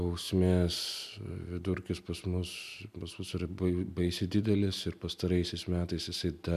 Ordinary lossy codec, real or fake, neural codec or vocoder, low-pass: MP3, 96 kbps; real; none; 14.4 kHz